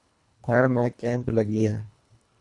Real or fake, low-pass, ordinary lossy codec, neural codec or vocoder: fake; 10.8 kHz; Opus, 64 kbps; codec, 24 kHz, 1.5 kbps, HILCodec